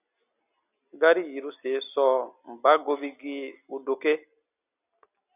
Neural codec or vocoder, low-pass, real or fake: none; 3.6 kHz; real